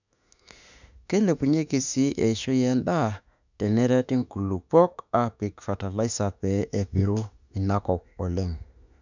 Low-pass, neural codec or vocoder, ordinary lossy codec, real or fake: 7.2 kHz; autoencoder, 48 kHz, 32 numbers a frame, DAC-VAE, trained on Japanese speech; none; fake